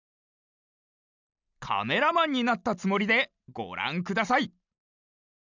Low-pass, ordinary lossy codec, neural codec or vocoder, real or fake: 7.2 kHz; none; none; real